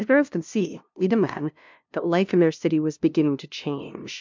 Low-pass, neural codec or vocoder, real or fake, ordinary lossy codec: 7.2 kHz; codec, 16 kHz, 0.5 kbps, FunCodec, trained on LibriTTS, 25 frames a second; fake; MP3, 64 kbps